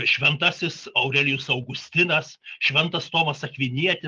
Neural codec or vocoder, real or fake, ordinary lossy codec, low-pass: none; real; Opus, 32 kbps; 7.2 kHz